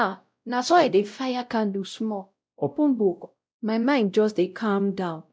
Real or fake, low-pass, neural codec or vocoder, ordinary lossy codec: fake; none; codec, 16 kHz, 0.5 kbps, X-Codec, WavLM features, trained on Multilingual LibriSpeech; none